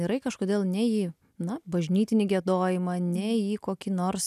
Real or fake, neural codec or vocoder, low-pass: real; none; 14.4 kHz